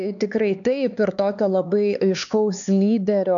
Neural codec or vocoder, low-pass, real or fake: codec, 16 kHz, 4 kbps, X-Codec, HuBERT features, trained on LibriSpeech; 7.2 kHz; fake